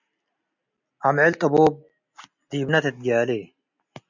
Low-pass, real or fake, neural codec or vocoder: 7.2 kHz; real; none